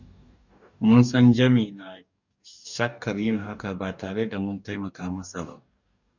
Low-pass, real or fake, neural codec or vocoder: 7.2 kHz; fake; codec, 44.1 kHz, 2.6 kbps, DAC